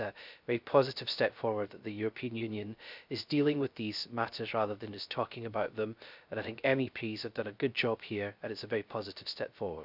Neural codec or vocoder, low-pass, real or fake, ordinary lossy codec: codec, 16 kHz, 0.3 kbps, FocalCodec; 5.4 kHz; fake; none